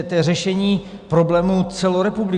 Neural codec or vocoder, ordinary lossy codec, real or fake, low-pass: none; Opus, 64 kbps; real; 10.8 kHz